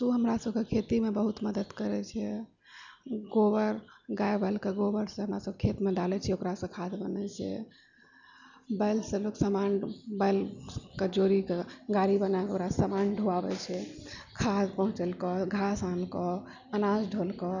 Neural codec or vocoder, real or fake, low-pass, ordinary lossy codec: none; real; 7.2 kHz; none